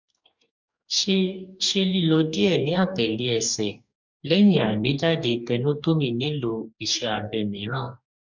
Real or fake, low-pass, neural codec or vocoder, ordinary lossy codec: fake; 7.2 kHz; codec, 44.1 kHz, 2.6 kbps, DAC; MP3, 64 kbps